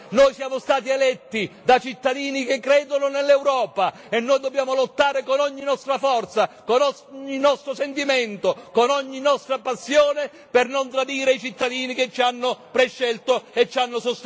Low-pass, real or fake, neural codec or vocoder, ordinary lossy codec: none; real; none; none